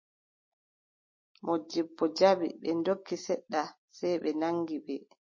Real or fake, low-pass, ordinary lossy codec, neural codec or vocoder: real; 7.2 kHz; MP3, 32 kbps; none